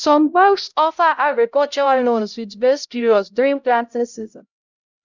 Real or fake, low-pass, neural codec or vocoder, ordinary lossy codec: fake; 7.2 kHz; codec, 16 kHz, 0.5 kbps, X-Codec, HuBERT features, trained on LibriSpeech; none